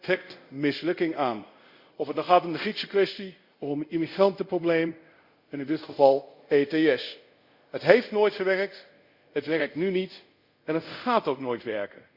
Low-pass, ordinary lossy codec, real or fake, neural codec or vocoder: 5.4 kHz; Opus, 64 kbps; fake; codec, 24 kHz, 0.5 kbps, DualCodec